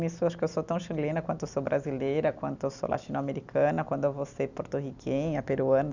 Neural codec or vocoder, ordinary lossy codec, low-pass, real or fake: none; none; 7.2 kHz; real